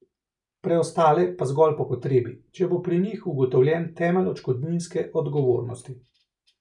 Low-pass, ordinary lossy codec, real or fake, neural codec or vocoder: 10.8 kHz; none; real; none